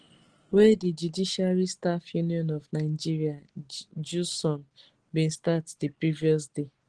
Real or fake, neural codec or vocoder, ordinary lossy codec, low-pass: real; none; Opus, 16 kbps; 9.9 kHz